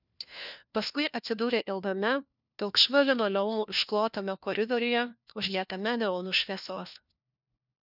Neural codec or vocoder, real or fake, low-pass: codec, 16 kHz, 1 kbps, FunCodec, trained on LibriTTS, 50 frames a second; fake; 5.4 kHz